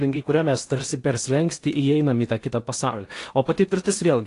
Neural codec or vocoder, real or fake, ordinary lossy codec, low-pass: codec, 16 kHz in and 24 kHz out, 0.8 kbps, FocalCodec, streaming, 65536 codes; fake; AAC, 48 kbps; 10.8 kHz